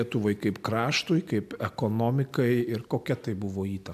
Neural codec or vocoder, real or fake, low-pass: none; real; 14.4 kHz